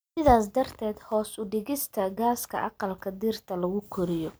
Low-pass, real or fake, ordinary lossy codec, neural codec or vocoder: none; real; none; none